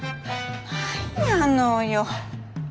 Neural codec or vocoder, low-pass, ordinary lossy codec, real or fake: none; none; none; real